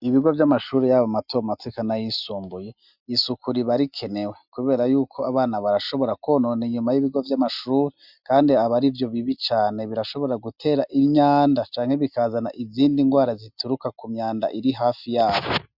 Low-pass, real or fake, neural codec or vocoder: 5.4 kHz; real; none